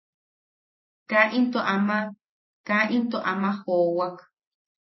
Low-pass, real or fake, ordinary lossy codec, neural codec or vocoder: 7.2 kHz; real; MP3, 24 kbps; none